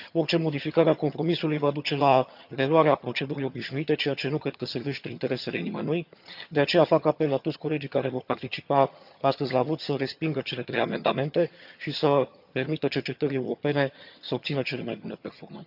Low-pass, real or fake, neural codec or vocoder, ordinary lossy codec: 5.4 kHz; fake; vocoder, 22.05 kHz, 80 mel bands, HiFi-GAN; none